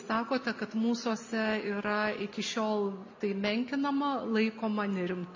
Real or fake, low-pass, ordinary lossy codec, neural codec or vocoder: real; 7.2 kHz; MP3, 48 kbps; none